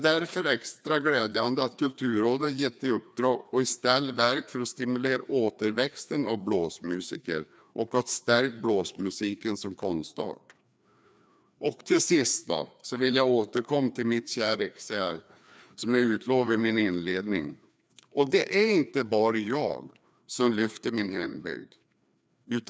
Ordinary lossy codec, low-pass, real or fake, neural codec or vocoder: none; none; fake; codec, 16 kHz, 2 kbps, FreqCodec, larger model